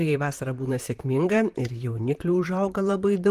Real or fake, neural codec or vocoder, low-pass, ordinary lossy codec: fake; vocoder, 44.1 kHz, 128 mel bands, Pupu-Vocoder; 14.4 kHz; Opus, 24 kbps